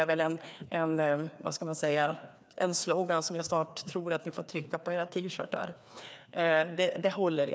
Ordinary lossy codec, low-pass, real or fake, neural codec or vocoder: none; none; fake; codec, 16 kHz, 2 kbps, FreqCodec, larger model